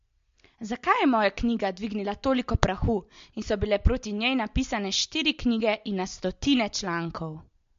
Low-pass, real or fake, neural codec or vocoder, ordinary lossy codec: 7.2 kHz; real; none; MP3, 48 kbps